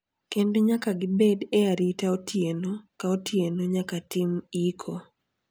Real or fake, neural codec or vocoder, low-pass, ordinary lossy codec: real; none; none; none